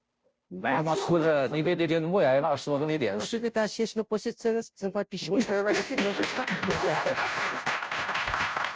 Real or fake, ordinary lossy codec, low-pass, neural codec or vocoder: fake; none; none; codec, 16 kHz, 0.5 kbps, FunCodec, trained on Chinese and English, 25 frames a second